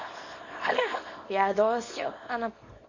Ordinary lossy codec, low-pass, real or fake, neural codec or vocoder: MP3, 32 kbps; 7.2 kHz; fake; codec, 24 kHz, 0.9 kbps, WavTokenizer, small release